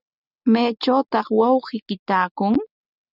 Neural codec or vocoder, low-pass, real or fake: none; 5.4 kHz; real